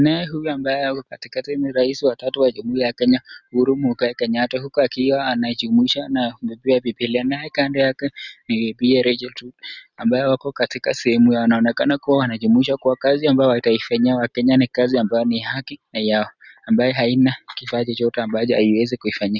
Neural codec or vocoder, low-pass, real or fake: none; 7.2 kHz; real